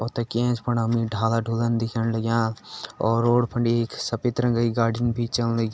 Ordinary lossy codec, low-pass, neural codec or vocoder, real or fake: none; none; none; real